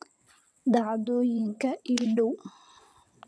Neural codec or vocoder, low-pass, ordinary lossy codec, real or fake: vocoder, 22.05 kHz, 80 mel bands, WaveNeXt; none; none; fake